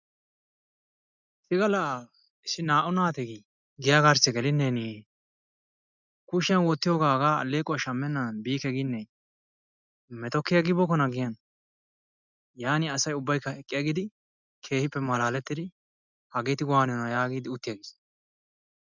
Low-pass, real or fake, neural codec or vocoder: 7.2 kHz; real; none